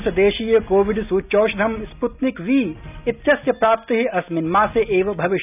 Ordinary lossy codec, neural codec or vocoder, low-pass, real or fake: none; none; 3.6 kHz; real